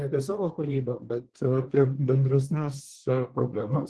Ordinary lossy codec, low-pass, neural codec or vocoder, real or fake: Opus, 16 kbps; 10.8 kHz; codec, 24 kHz, 1 kbps, SNAC; fake